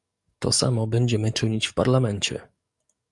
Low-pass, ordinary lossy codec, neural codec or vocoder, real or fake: 10.8 kHz; Opus, 64 kbps; codec, 44.1 kHz, 7.8 kbps, DAC; fake